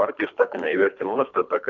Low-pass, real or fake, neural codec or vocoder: 7.2 kHz; fake; codec, 24 kHz, 3 kbps, HILCodec